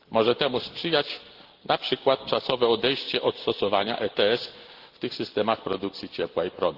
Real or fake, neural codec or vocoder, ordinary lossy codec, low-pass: real; none; Opus, 16 kbps; 5.4 kHz